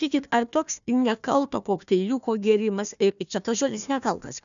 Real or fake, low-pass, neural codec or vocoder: fake; 7.2 kHz; codec, 16 kHz, 1 kbps, FunCodec, trained on Chinese and English, 50 frames a second